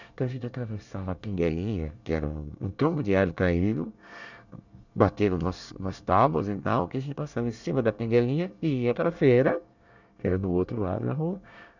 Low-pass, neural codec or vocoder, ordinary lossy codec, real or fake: 7.2 kHz; codec, 24 kHz, 1 kbps, SNAC; none; fake